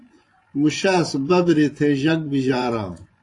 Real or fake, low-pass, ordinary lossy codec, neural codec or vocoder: fake; 10.8 kHz; AAC, 48 kbps; vocoder, 24 kHz, 100 mel bands, Vocos